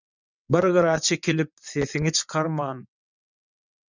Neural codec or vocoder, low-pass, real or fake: vocoder, 22.05 kHz, 80 mel bands, WaveNeXt; 7.2 kHz; fake